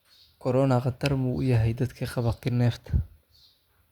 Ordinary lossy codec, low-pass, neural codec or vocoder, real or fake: Opus, 64 kbps; 19.8 kHz; none; real